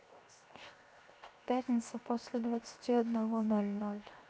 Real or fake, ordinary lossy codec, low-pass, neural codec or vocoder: fake; none; none; codec, 16 kHz, 0.7 kbps, FocalCodec